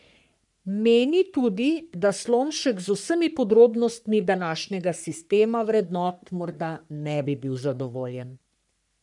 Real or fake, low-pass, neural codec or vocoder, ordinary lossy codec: fake; 10.8 kHz; codec, 44.1 kHz, 3.4 kbps, Pupu-Codec; none